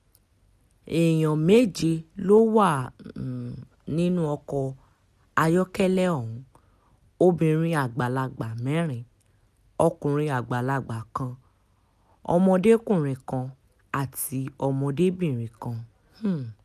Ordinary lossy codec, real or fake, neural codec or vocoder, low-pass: none; real; none; 14.4 kHz